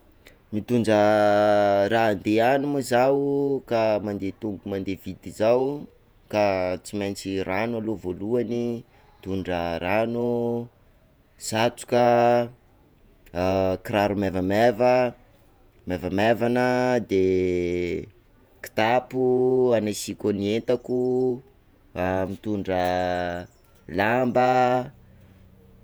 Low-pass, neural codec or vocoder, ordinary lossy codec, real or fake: none; vocoder, 48 kHz, 128 mel bands, Vocos; none; fake